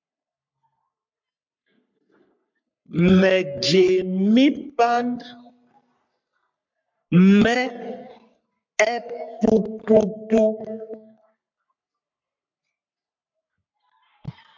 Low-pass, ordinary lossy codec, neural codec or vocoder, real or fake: 7.2 kHz; MP3, 64 kbps; codec, 44.1 kHz, 3.4 kbps, Pupu-Codec; fake